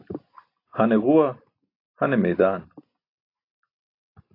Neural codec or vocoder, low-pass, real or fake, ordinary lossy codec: none; 5.4 kHz; real; AAC, 32 kbps